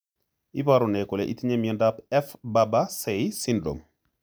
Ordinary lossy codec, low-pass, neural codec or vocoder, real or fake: none; none; none; real